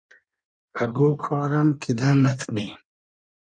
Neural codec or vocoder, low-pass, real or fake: codec, 16 kHz in and 24 kHz out, 1.1 kbps, FireRedTTS-2 codec; 9.9 kHz; fake